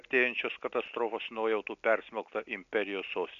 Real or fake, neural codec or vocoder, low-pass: real; none; 7.2 kHz